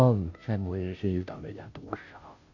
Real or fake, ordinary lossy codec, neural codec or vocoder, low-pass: fake; AAC, 48 kbps; codec, 16 kHz, 0.5 kbps, FunCodec, trained on Chinese and English, 25 frames a second; 7.2 kHz